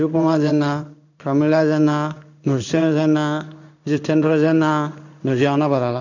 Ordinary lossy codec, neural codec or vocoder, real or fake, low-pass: none; codec, 16 kHz in and 24 kHz out, 1 kbps, XY-Tokenizer; fake; 7.2 kHz